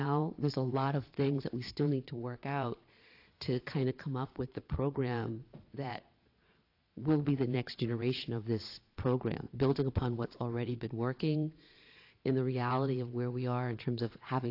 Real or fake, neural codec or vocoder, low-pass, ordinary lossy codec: fake; vocoder, 22.05 kHz, 80 mel bands, Vocos; 5.4 kHz; AAC, 32 kbps